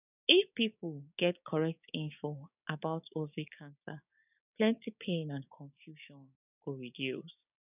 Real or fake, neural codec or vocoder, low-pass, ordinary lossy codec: fake; codec, 16 kHz, 6 kbps, DAC; 3.6 kHz; none